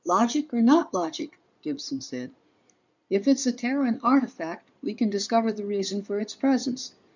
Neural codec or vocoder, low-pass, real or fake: codec, 16 kHz in and 24 kHz out, 2.2 kbps, FireRedTTS-2 codec; 7.2 kHz; fake